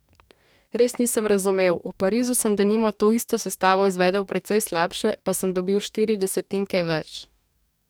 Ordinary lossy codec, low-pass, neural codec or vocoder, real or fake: none; none; codec, 44.1 kHz, 2.6 kbps, DAC; fake